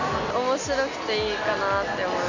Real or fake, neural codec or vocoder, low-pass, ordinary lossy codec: real; none; 7.2 kHz; none